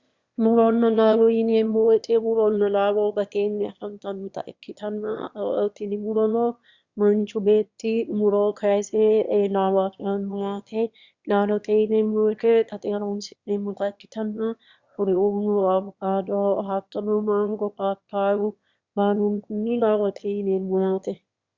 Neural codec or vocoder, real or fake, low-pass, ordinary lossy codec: autoencoder, 22.05 kHz, a latent of 192 numbers a frame, VITS, trained on one speaker; fake; 7.2 kHz; Opus, 64 kbps